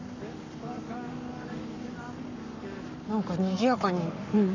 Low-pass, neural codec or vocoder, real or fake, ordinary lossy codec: 7.2 kHz; codec, 44.1 kHz, 7.8 kbps, Pupu-Codec; fake; none